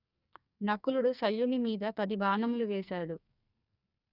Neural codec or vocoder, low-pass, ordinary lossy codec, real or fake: codec, 44.1 kHz, 2.6 kbps, SNAC; 5.4 kHz; none; fake